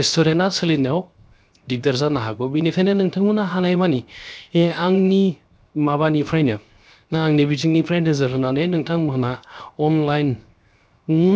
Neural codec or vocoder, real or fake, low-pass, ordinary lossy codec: codec, 16 kHz, 0.7 kbps, FocalCodec; fake; none; none